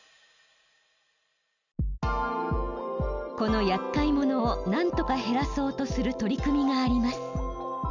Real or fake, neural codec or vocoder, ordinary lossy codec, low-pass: real; none; none; 7.2 kHz